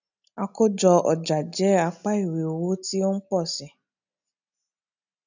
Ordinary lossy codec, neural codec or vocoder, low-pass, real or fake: none; none; 7.2 kHz; real